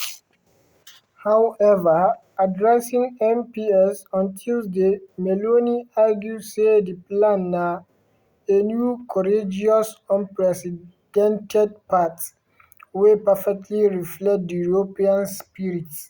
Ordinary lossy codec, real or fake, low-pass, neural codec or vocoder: Opus, 64 kbps; real; 19.8 kHz; none